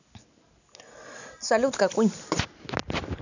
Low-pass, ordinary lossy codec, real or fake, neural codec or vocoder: 7.2 kHz; none; real; none